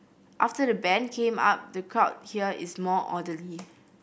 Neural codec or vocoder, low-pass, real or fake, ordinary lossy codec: none; none; real; none